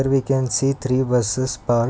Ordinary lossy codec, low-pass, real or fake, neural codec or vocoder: none; none; real; none